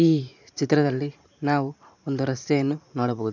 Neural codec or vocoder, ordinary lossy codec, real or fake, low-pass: vocoder, 44.1 kHz, 128 mel bands every 512 samples, BigVGAN v2; none; fake; 7.2 kHz